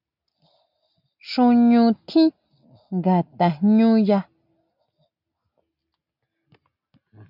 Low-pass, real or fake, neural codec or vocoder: 5.4 kHz; real; none